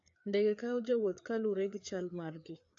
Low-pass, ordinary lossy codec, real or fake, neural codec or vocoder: 7.2 kHz; AAC, 48 kbps; fake; codec, 16 kHz, 4 kbps, FreqCodec, larger model